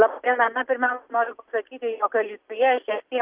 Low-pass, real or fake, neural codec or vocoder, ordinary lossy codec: 3.6 kHz; real; none; Opus, 32 kbps